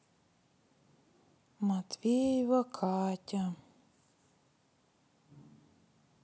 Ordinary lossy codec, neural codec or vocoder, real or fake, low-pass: none; none; real; none